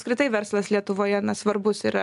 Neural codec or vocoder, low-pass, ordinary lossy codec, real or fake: none; 10.8 kHz; MP3, 96 kbps; real